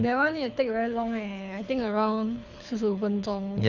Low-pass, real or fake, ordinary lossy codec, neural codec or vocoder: 7.2 kHz; fake; none; codec, 24 kHz, 6 kbps, HILCodec